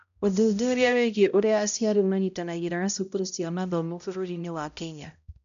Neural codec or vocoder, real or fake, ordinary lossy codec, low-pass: codec, 16 kHz, 0.5 kbps, X-Codec, HuBERT features, trained on balanced general audio; fake; none; 7.2 kHz